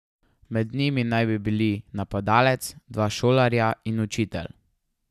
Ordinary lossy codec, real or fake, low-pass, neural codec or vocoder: none; real; 14.4 kHz; none